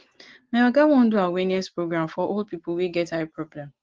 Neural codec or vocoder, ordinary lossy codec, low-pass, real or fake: none; Opus, 16 kbps; 7.2 kHz; real